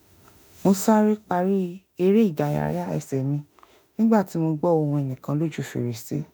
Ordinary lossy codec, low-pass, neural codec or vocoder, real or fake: none; none; autoencoder, 48 kHz, 32 numbers a frame, DAC-VAE, trained on Japanese speech; fake